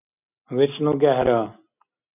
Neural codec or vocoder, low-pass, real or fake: none; 3.6 kHz; real